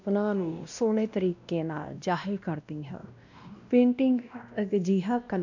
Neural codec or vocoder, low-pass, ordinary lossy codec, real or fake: codec, 16 kHz, 1 kbps, X-Codec, WavLM features, trained on Multilingual LibriSpeech; 7.2 kHz; none; fake